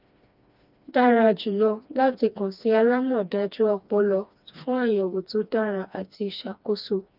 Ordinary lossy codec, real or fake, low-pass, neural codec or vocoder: none; fake; 5.4 kHz; codec, 16 kHz, 2 kbps, FreqCodec, smaller model